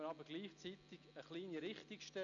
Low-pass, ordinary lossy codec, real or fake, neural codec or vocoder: 7.2 kHz; none; real; none